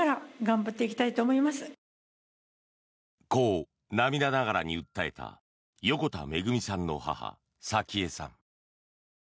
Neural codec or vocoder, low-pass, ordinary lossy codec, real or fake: none; none; none; real